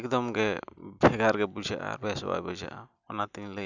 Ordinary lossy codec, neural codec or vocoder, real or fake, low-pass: none; none; real; 7.2 kHz